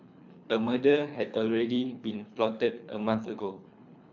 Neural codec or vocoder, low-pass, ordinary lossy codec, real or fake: codec, 24 kHz, 3 kbps, HILCodec; 7.2 kHz; none; fake